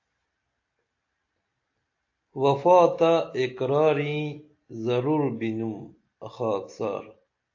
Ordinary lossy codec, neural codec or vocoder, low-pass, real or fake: AAC, 48 kbps; none; 7.2 kHz; real